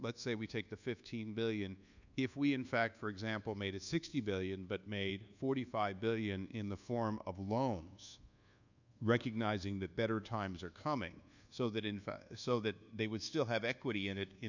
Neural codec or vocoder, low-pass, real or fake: codec, 24 kHz, 1.2 kbps, DualCodec; 7.2 kHz; fake